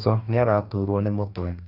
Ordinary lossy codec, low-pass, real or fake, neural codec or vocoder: none; 5.4 kHz; fake; codec, 44.1 kHz, 2.6 kbps, DAC